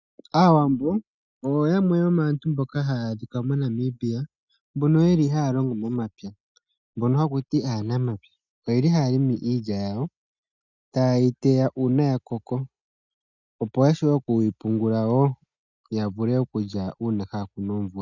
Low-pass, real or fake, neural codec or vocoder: 7.2 kHz; real; none